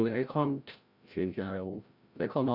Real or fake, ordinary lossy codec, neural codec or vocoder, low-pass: fake; Opus, 64 kbps; codec, 16 kHz, 0.5 kbps, FreqCodec, larger model; 5.4 kHz